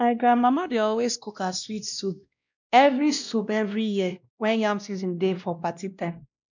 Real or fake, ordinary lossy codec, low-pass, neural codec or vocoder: fake; none; 7.2 kHz; codec, 16 kHz, 1 kbps, X-Codec, WavLM features, trained on Multilingual LibriSpeech